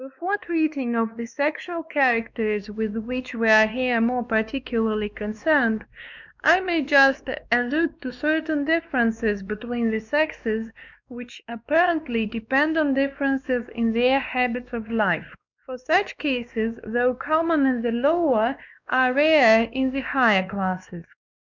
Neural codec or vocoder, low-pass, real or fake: codec, 16 kHz, 2 kbps, X-Codec, WavLM features, trained on Multilingual LibriSpeech; 7.2 kHz; fake